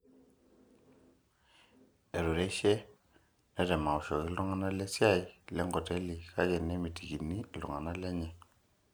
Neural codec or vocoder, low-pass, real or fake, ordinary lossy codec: none; none; real; none